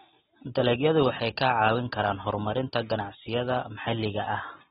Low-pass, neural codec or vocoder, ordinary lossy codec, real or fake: 19.8 kHz; none; AAC, 16 kbps; real